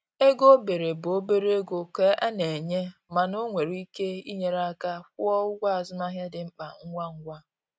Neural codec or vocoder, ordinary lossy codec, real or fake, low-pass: none; none; real; none